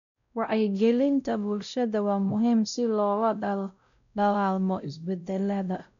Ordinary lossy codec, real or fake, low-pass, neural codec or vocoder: none; fake; 7.2 kHz; codec, 16 kHz, 0.5 kbps, X-Codec, WavLM features, trained on Multilingual LibriSpeech